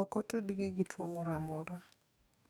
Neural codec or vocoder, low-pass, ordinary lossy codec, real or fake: codec, 44.1 kHz, 2.6 kbps, DAC; none; none; fake